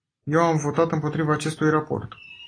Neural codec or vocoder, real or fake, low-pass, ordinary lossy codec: none; real; 9.9 kHz; AAC, 32 kbps